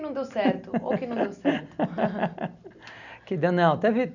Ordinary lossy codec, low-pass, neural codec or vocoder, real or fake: none; 7.2 kHz; none; real